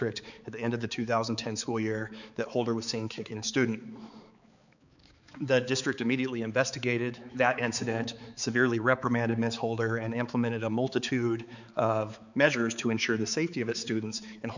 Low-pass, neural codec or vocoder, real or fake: 7.2 kHz; codec, 16 kHz, 4 kbps, X-Codec, HuBERT features, trained on balanced general audio; fake